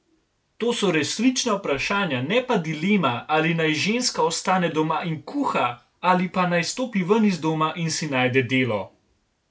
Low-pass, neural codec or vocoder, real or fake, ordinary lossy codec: none; none; real; none